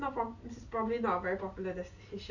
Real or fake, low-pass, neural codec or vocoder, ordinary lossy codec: real; 7.2 kHz; none; none